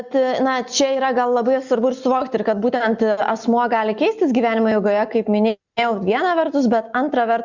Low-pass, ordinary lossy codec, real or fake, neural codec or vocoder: 7.2 kHz; Opus, 64 kbps; real; none